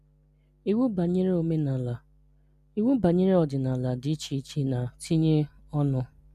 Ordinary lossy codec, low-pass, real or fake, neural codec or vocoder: none; 14.4 kHz; real; none